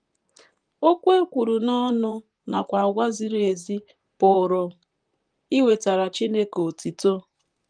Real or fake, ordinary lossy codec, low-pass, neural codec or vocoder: fake; Opus, 32 kbps; 9.9 kHz; vocoder, 44.1 kHz, 128 mel bands, Pupu-Vocoder